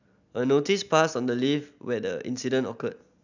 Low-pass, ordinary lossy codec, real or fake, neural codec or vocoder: 7.2 kHz; none; real; none